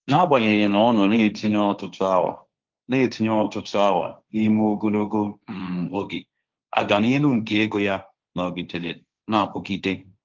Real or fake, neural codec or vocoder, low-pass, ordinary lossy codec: fake; codec, 16 kHz, 1.1 kbps, Voila-Tokenizer; 7.2 kHz; Opus, 24 kbps